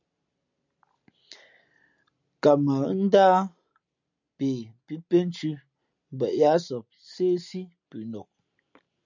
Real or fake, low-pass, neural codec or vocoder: real; 7.2 kHz; none